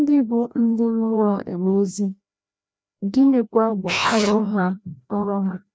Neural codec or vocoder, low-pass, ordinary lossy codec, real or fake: codec, 16 kHz, 1 kbps, FreqCodec, larger model; none; none; fake